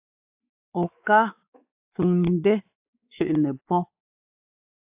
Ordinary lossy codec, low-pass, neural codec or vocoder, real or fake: AAC, 32 kbps; 3.6 kHz; codec, 16 kHz, 4 kbps, X-Codec, WavLM features, trained on Multilingual LibriSpeech; fake